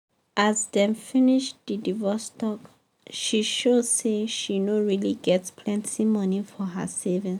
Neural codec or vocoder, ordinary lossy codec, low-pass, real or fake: none; none; 19.8 kHz; real